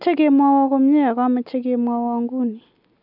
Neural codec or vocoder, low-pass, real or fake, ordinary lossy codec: none; 5.4 kHz; real; none